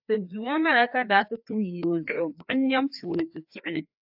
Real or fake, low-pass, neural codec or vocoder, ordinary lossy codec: fake; 5.4 kHz; codec, 16 kHz, 2 kbps, FreqCodec, larger model; AAC, 48 kbps